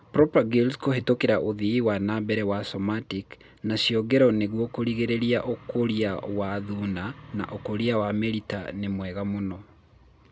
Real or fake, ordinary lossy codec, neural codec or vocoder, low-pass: real; none; none; none